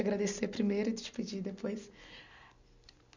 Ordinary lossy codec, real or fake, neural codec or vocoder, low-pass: none; real; none; 7.2 kHz